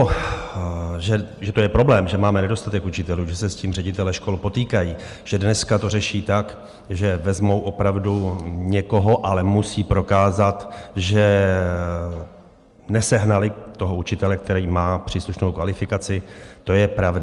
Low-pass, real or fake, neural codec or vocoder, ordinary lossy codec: 10.8 kHz; real; none; Opus, 32 kbps